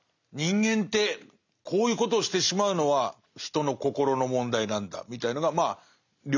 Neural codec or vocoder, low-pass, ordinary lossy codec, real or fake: none; 7.2 kHz; none; real